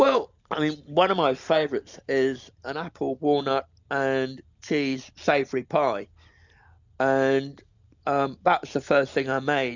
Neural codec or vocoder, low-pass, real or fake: none; 7.2 kHz; real